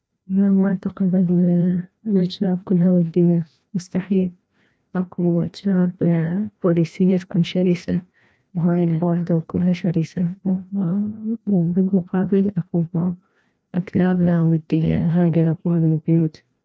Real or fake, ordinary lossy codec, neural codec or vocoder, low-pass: fake; none; codec, 16 kHz, 1 kbps, FreqCodec, larger model; none